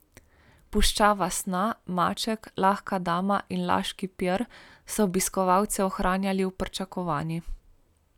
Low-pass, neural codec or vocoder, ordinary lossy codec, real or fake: 19.8 kHz; none; none; real